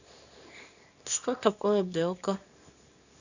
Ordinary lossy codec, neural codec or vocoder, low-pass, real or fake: none; codec, 24 kHz, 0.9 kbps, WavTokenizer, small release; 7.2 kHz; fake